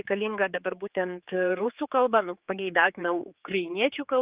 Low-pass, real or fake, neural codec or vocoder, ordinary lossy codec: 3.6 kHz; fake; codec, 16 kHz, 4 kbps, X-Codec, HuBERT features, trained on general audio; Opus, 24 kbps